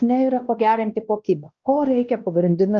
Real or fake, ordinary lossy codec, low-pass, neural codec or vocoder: fake; Opus, 24 kbps; 7.2 kHz; codec, 16 kHz, 1 kbps, X-Codec, WavLM features, trained on Multilingual LibriSpeech